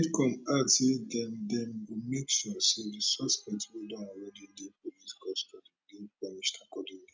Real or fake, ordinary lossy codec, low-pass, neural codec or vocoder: real; none; none; none